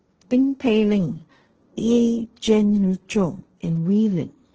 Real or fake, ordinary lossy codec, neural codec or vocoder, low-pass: fake; Opus, 16 kbps; codec, 16 kHz, 1.1 kbps, Voila-Tokenizer; 7.2 kHz